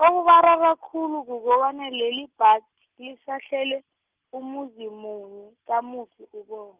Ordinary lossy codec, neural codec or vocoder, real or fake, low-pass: Opus, 32 kbps; none; real; 3.6 kHz